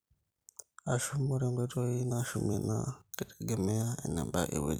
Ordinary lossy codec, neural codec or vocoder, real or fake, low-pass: none; vocoder, 44.1 kHz, 128 mel bands every 256 samples, BigVGAN v2; fake; none